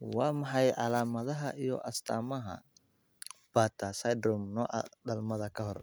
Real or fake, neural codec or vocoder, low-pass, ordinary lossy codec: real; none; none; none